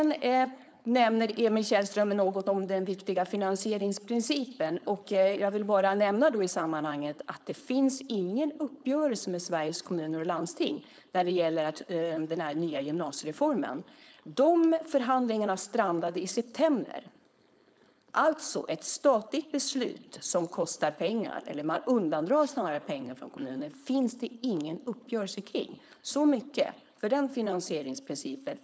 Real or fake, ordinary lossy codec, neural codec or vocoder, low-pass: fake; none; codec, 16 kHz, 4.8 kbps, FACodec; none